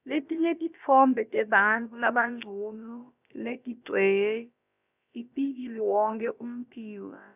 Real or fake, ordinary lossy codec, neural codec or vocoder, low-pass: fake; none; codec, 16 kHz, about 1 kbps, DyCAST, with the encoder's durations; 3.6 kHz